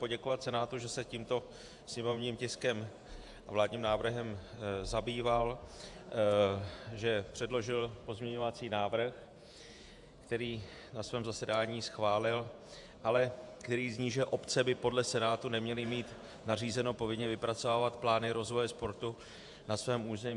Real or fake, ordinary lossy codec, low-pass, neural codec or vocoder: fake; AAC, 64 kbps; 10.8 kHz; vocoder, 48 kHz, 128 mel bands, Vocos